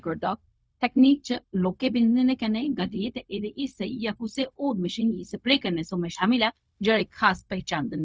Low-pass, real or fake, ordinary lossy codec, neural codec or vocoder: none; fake; none; codec, 16 kHz, 0.4 kbps, LongCat-Audio-Codec